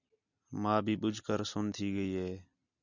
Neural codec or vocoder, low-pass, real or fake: none; 7.2 kHz; real